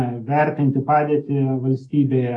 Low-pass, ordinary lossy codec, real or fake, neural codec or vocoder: 10.8 kHz; AAC, 32 kbps; fake; autoencoder, 48 kHz, 128 numbers a frame, DAC-VAE, trained on Japanese speech